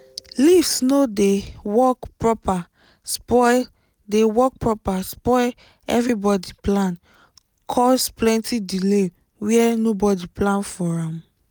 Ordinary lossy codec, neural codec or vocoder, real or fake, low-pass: none; none; real; none